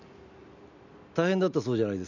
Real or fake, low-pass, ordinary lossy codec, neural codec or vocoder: real; 7.2 kHz; none; none